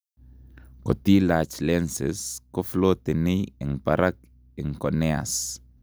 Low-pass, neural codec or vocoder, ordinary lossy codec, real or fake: none; none; none; real